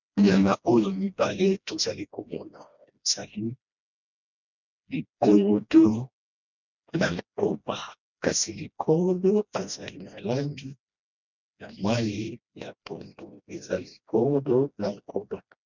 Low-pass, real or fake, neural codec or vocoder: 7.2 kHz; fake; codec, 16 kHz, 1 kbps, FreqCodec, smaller model